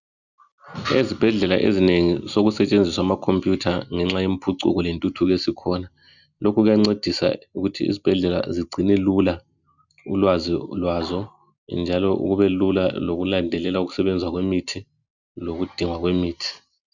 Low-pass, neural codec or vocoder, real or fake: 7.2 kHz; none; real